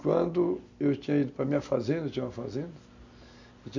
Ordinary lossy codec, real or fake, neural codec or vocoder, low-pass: none; real; none; 7.2 kHz